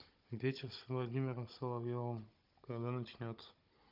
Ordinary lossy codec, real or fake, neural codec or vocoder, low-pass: Opus, 24 kbps; fake; codec, 16 kHz, 16 kbps, FunCodec, trained on Chinese and English, 50 frames a second; 5.4 kHz